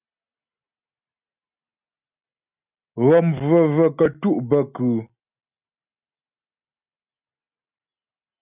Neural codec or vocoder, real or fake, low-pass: none; real; 3.6 kHz